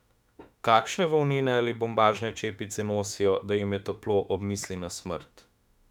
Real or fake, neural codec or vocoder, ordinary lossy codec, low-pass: fake; autoencoder, 48 kHz, 32 numbers a frame, DAC-VAE, trained on Japanese speech; none; 19.8 kHz